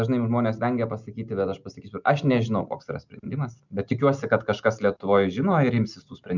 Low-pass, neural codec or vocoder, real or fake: 7.2 kHz; none; real